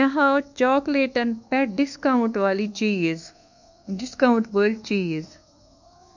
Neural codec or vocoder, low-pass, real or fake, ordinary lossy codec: codec, 24 kHz, 1.2 kbps, DualCodec; 7.2 kHz; fake; none